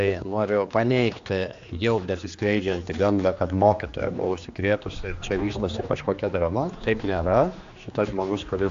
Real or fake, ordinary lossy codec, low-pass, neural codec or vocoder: fake; AAC, 64 kbps; 7.2 kHz; codec, 16 kHz, 2 kbps, X-Codec, HuBERT features, trained on general audio